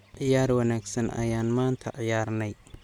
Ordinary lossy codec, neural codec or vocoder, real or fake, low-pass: none; none; real; 19.8 kHz